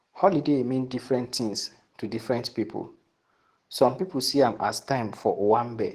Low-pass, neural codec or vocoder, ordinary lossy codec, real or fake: 14.4 kHz; codec, 44.1 kHz, 7.8 kbps, DAC; Opus, 16 kbps; fake